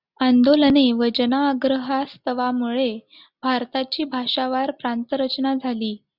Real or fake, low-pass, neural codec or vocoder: real; 5.4 kHz; none